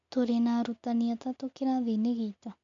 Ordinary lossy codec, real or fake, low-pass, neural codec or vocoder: MP3, 64 kbps; real; 7.2 kHz; none